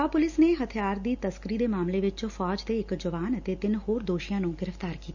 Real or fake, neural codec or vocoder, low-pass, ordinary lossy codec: real; none; 7.2 kHz; none